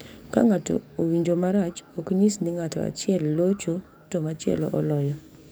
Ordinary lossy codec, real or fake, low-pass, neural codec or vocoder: none; fake; none; codec, 44.1 kHz, 7.8 kbps, DAC